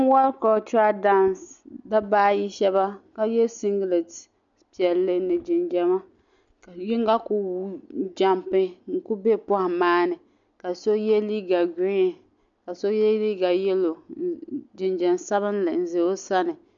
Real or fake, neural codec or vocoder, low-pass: real; none; 7.2 kHz